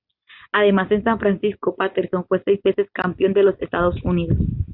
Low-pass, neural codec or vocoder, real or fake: 5.4 kHz; none; real